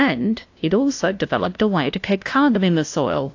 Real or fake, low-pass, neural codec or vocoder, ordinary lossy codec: fake; 7.2 kHz; codec, 16 kHz, 0.5 kbps, FunCodec, trained on LibriTTS, 25 frames a second; AAC, 48 kbps